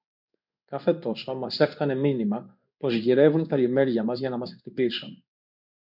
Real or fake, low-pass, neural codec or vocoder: fake; 5.4 kHz; codec, 16 kHz in and 24 kHz out, 1 kbps, XY-Tokenizer